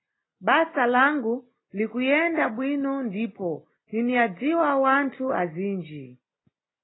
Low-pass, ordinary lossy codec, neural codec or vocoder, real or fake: 7.2 kHz; AAC, 16 kbps; none; real